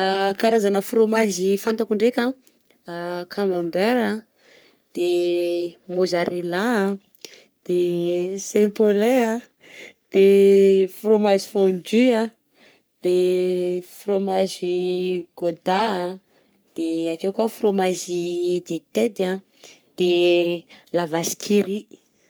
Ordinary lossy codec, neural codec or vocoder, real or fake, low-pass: none; codec, 44.1 kHz, 3.4 kbps, Pupu-Codec; fake; none